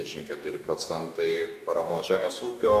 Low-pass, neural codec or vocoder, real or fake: 14.4 kHz; codec, 44.1 kHz, 2.6 kbps, DAC; fake